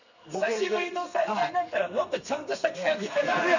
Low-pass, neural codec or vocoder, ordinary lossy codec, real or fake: 7.2 kHz; codec, 32 kHz, 1.9 kbps, SNAC; none; fake